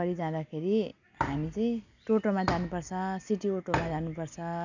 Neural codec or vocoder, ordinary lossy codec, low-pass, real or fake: none; none; 7.2 kHz; real